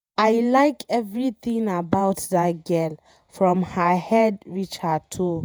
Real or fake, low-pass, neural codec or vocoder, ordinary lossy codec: fake; none; vocoder, 48 kHz, 128 mel bands, Vocos; none